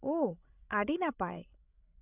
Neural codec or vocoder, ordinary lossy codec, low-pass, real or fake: codec, 16 kHz, 16 kbps, FreqCodec, larger model; none; 3.6 kHz; fake